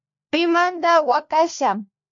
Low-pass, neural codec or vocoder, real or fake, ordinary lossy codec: 7.2 kHz; codec, 16 kHz, 1 kbps, FunCodec, trained on LibriTTS, 50 frames a second; fake; MP3, 48 kbps